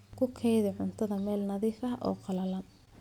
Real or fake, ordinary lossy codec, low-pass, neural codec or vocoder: real; none; 19.8 kHz; none